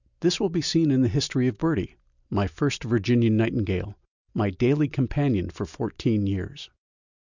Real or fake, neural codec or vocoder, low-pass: real; none; 7.2 kHz